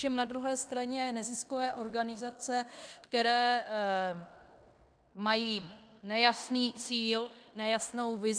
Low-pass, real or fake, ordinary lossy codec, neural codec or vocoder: 9.9 kHz; fake; MP3, 96 kbps; codec, 16 kHz in and 24 kHz out, 0.9 kbps, LongCat-Audio-Codec, fine tuned four codebook decoder